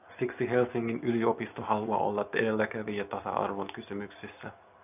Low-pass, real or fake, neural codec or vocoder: 3.6 kHz; real; none